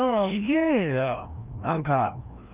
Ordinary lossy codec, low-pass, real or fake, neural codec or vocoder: Opus, 32 kbps; 3.6 kHz; fake; codec, 16 kHz, 1 kbps, FreqCodec, larger model